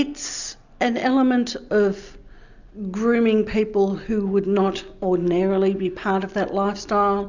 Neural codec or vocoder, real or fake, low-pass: none; real; 7.2 kHz